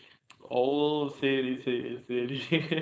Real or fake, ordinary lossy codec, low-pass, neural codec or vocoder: fake; none; none; codec, 16 kHz, 4.8 kbps, FACodec